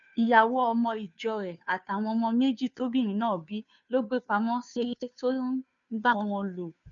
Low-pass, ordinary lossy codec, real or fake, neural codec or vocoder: 7.2 kHz; none; fake; codec, 16 kHz, 2 kbps, FunCodec, trained on Chinese and English, 25 frames a second